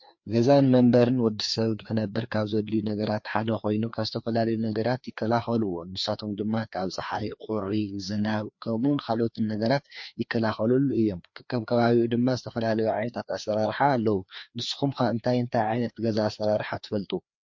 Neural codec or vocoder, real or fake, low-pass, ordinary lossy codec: codec, 16 kHz, 2 kbps, FreqCodec, larger model; fake; 7.2 kHz; MP3, 48 kbps